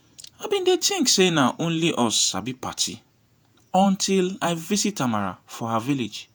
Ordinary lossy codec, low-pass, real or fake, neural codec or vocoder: none; none; fake; vocoder, 48 kHz, 128 mel bands, Vocos